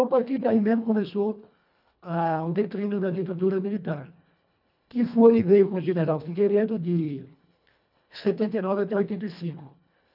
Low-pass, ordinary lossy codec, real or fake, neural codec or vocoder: 5.4 kHz; none; fake; codec, 24 kHz, 1.5 kbps, HILCodec